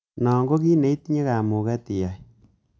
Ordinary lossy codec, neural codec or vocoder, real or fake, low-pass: none; none; real; none